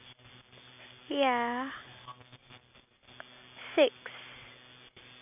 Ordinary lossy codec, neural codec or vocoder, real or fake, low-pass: none; none; real; 3.6 kHz